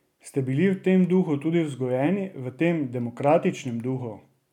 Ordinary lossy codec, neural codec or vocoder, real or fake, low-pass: none; none; real; 19.8 kHz